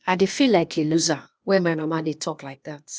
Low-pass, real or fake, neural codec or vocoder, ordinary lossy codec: none; fake; codec, 16 kHz, 0.8 kbps, ZipCodec; none